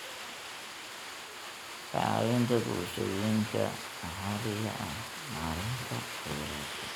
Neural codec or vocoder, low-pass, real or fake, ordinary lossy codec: none; none; real; none